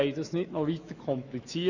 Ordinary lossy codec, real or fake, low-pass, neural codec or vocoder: none; fake; 7.2 kHz; autoencoder, 48 kHz, 128 numbers a frame, DAC-VAE, trained on Japanese speech